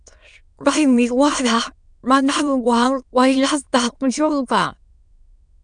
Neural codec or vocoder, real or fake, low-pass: autoencoder, 22.05 kHz, a latent of 192 numbers a frame, VITS, trained on many speakers; fake; 9.9 kHz